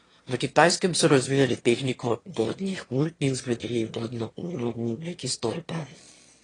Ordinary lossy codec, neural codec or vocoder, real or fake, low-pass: AAC, 32 kbps; autoencoder, 22.05 kHz, a latent of 192 numbers a frame, VITS, trained on one speaker; fake; 9.9 kHz